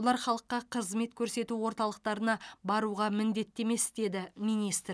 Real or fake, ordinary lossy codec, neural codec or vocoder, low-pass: real; none; none; none